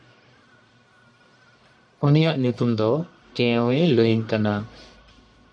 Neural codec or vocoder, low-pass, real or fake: codec, 44.1 kHz, 1.7 kbps, Pupu-Codec; 9.9 kHz; fake